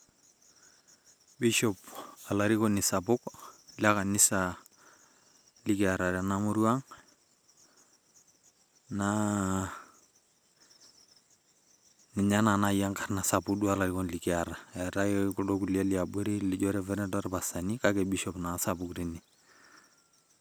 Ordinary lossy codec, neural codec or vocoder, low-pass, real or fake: none; none; none; real